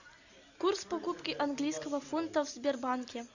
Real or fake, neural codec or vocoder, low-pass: real; none; 7.2 kHz